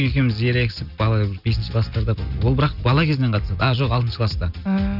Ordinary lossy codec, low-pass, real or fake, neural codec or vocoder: none; 5.4 kHz; real; none